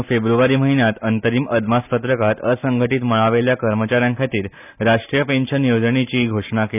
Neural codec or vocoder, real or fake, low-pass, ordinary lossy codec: none; real; 3.6 kHz; none